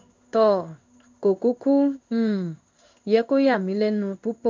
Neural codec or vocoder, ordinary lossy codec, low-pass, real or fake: codec, 16 kHz in and 24 kHz out, 1 kbps, XY-Tokenizer; MP3, 64 kbps; 7.2 kHz; fake